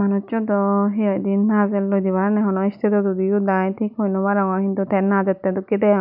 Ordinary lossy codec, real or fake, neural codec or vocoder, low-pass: none; real; none; 5.4 kHz